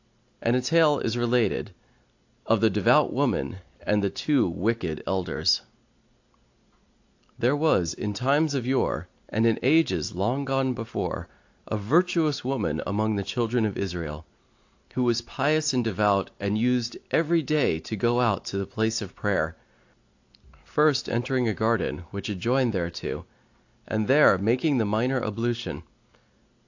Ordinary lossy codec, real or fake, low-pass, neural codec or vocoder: AAC, 48 kbps; real; 7.2 kHz; none